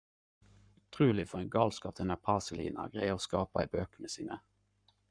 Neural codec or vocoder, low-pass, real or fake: codec, 44.1 kHz, 7.8 kbps, Pupu-Codec; 9.9 kHz; fake